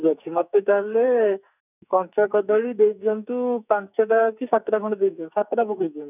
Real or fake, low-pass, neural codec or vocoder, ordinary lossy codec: fake; 3.6 kHz; codec, 44.1 kHz, 2.6 kbps, SNAC; none